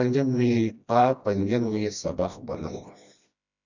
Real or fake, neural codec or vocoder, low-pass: fake; codec, 16 kHz, 1 kbps, FreqCodec, smaller model; 7.2 kHz